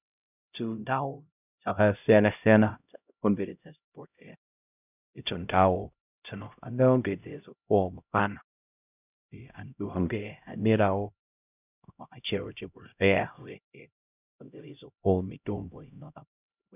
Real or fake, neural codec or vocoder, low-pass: fake; codec, 16 kHz, 0.5 kbps, X-Codec, HuBERT features, trained on LibriSpeech; 3.6 kHz